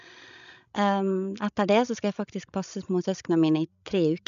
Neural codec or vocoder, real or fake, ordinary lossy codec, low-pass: codec, 16 kHz, 16 kbps, FreqCodec, larger model; fake; none; 7.2 kHz